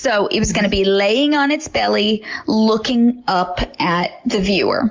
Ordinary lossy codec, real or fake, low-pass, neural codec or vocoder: Opus, 32 kbps; real; 7.2 kHz; none